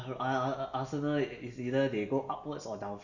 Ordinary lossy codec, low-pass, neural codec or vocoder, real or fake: none; 7.2 kHz; none; real